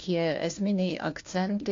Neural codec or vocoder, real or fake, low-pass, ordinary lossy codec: codec, 16 kHz, 1 kbps, FunCodec, trained on LibriTTS, 50 frames a second; fake; 7.2 kHz; AAC, 48 kbps